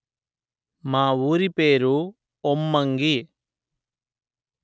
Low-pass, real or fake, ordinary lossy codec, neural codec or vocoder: none; real; none; none